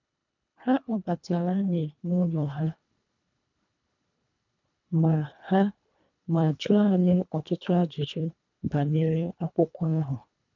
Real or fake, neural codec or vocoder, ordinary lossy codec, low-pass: fake; codec, 24 kHz, 1.5 kbps, HILCodec; none; 7.2 kHz